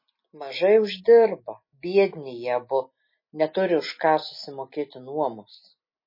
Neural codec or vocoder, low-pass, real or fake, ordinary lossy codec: none; 5.4 kHz; real; MP3, 24 kbps